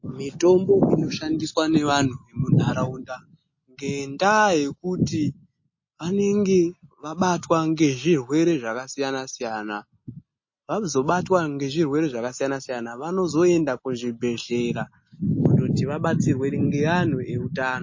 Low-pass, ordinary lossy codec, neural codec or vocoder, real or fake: 7.2 kHz; MP3, 32 kbps; none; real